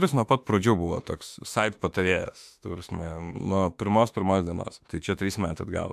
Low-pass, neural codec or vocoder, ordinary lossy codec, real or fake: 14.4 kHz; autoencoder, 48 kHz, 32 numbers a frame, DAC-VAE, trained on Japanese speech; MP3, 96 kbps; fake